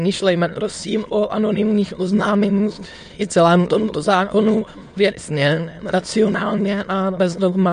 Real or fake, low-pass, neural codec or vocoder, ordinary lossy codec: fake; 9.9 kHz; autoencoder, 22.05 kHz, a latent of 192 numbers a frame, VITS, trained on many speakers; MP3, 48 kbps